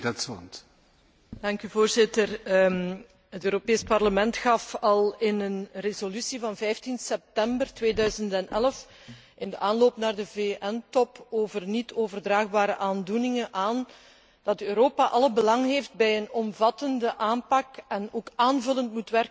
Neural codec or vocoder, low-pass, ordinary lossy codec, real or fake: none; none; none; real